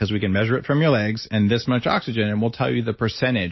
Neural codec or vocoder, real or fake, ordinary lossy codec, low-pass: none; real; MP3, 24 kbps; 7.2 kHz